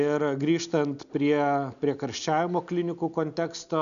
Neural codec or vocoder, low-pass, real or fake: none; 7.2 kHz; real